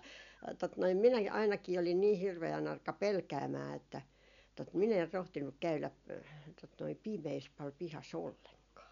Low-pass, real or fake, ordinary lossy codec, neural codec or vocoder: 7.2 kHz; real; none; none